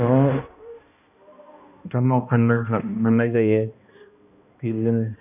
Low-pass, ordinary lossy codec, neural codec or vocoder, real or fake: 3.6 kHz; none; codec, 16 kHz, 1 kbps, X-Codec, HuBERT features, trained on balanced general audio; fake